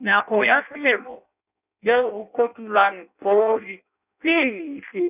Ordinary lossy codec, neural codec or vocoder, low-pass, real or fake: none; codec, 16 kHz in and 24 kHz out, 0.6 kbps, FireRedTTS-2 codec; 3.6 kHz; fake